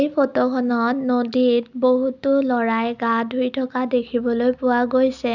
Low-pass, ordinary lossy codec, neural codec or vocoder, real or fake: 7.2 kHz; none; none; real